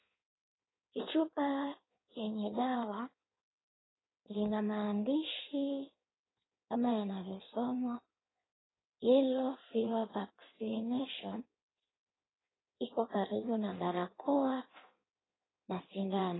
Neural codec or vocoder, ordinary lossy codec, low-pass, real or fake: codec, 16 kHz in and 24 kHz out, 1.1 kbps, FireRedTTS-2 codec; AAC, 16 kbps; 7.2 kHz; fake